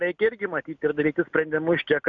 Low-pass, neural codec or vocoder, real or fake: 7.2 kHz; none; real